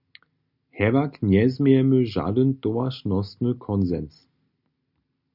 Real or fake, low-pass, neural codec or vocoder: real; 5.4 kHz; none